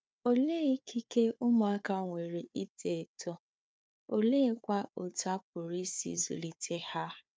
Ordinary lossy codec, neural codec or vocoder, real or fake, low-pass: none; codec, 16 kHz, 4 kbps, FunCodec, trained on Chinese and English, 50 frames a second; fake; none